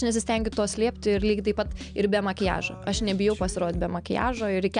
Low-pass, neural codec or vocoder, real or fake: 9.9 kHz; none; real